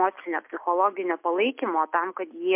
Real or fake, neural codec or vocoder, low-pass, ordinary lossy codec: real; none; 3.6 kHz; MP3, 32 kbps